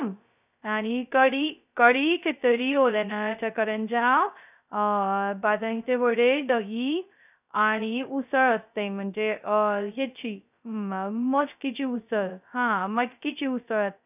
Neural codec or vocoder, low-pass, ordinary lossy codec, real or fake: codec, 16 kHz, 0.2 kbps, FocalCodec; 3.6 kHz; none; fake